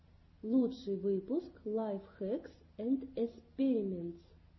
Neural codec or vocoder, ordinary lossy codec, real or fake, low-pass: none; MP3, 24 kbps; real; 7.2 kHz